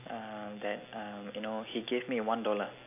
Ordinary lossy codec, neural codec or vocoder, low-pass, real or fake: none; none; 3.6 kHz; real